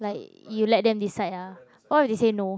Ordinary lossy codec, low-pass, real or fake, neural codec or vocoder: none; none; real; none